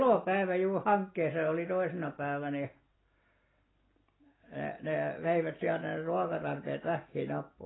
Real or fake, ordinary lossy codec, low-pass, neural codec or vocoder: real; AAC, 16 kbps; 7.2 kHz; none